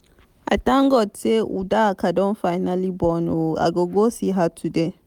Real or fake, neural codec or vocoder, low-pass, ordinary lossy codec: real; none; 19.8 kHz; none